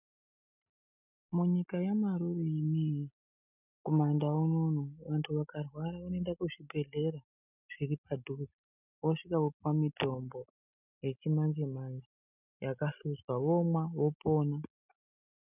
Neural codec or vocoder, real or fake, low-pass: none; real; 3.6 kHz